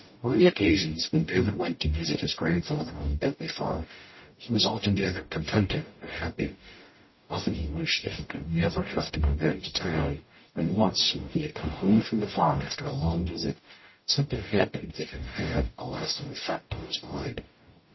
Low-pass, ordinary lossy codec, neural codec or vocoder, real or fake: 7.2 kHz; MP3, 24 kbps; codec, 44.1 kHz, 0.9 kbps, DAC; fake